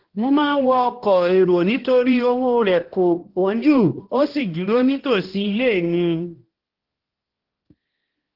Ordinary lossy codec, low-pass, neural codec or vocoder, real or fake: Opus, 16 kbps; 5.4 kHz; codec, 16 kHz, 1 kbps, X-Codec, HuBERT features, trained on balanced general audio; fake